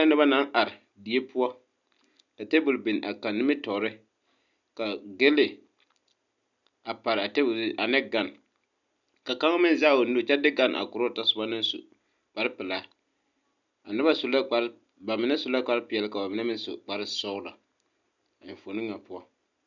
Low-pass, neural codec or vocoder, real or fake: 7.2 kHz; none; real